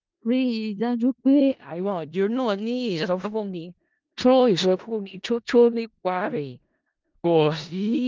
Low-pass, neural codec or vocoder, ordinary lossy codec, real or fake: 7.2 kHz; codec, 16 kHz in and 24 kHz out, 0.4 kbps, LongCat-Audio-Codec, four codebook decoder; Opus, 24 kbps; fake